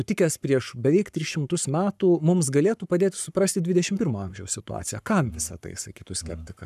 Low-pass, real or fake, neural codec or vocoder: 14.4 kHz; fake; codec, 44.1 kHz, 7.8 kbps, Pupu-Codec